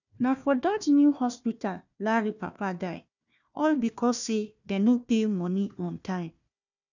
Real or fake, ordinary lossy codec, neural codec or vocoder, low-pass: fake; none; codec, 16 kHz, 1 kbps, FunCodec, trained on Chinese and English, 50 frames a second; 7.2 kHz